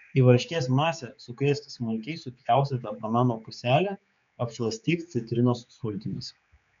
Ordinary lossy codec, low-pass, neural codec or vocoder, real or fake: MP3, 64 kbps; 7.2 kHz; codec, 16 kHz, 4 kbps, X-Codec, HuBERT features, trained on general audio; fake